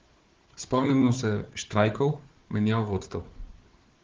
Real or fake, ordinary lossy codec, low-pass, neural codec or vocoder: fake; Opus, 16 kbps; 7.2 kHz; codec, 16 kHz, 4 kbps, FunCodec, trained on Chinese and English, 50 frames a second